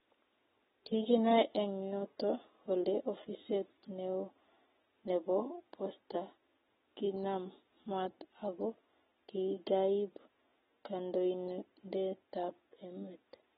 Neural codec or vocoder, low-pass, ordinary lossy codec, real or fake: none; 7.2 kHz; AAC, 16 kbps; real